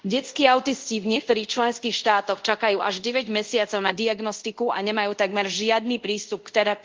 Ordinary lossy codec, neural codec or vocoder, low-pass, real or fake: Opus, 32 kbps; codec, 24 kHz, 0.5 kbps, DualCodec; 7.2 kHz; fake